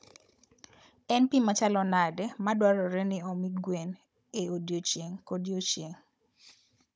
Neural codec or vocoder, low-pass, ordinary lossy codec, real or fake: codec, 16 kHz, 16 kbps, FunCodec, trained on Chinese and English, 50 frames a second; none; none; fake